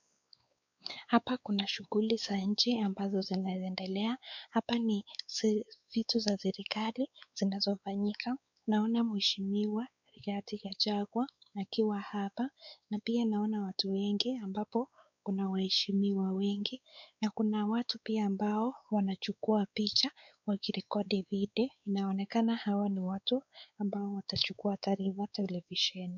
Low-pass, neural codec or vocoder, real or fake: 7.2 kHz; codec, 16 kHz, 4 kbps, X-Codec, WavLM features, trained on Multilingual LibriSpeech; fake